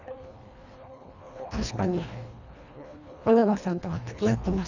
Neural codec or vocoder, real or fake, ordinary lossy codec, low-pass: codec, 24 kHz, 1.5 kbps, HILCodec; fake; none; 7.2 kHz